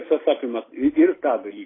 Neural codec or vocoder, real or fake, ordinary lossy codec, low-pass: none; real; AAC, 16 kbps; 7.2 kHz